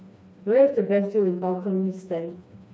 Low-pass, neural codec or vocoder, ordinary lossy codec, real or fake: none; codec, 16 kHz, 2 kbps, FreqCodec, smaller model; none; fake